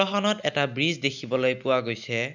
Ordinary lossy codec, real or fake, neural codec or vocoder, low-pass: none; real; none; 7.2 kHz